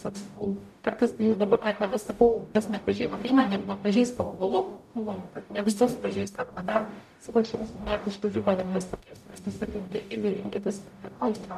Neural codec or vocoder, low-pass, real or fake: codec, 44.1 kHz, 0.9 kbps, DAC; 14.4 kHz; fake